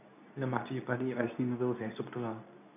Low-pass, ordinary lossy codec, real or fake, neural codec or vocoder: 3.6 kHz; none; fake; codec, 24 kHz, 0.9 kbps, WavTokenizer, medium speech release version 2